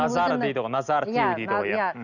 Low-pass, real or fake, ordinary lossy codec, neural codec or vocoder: 7.2 kHz; real; none; none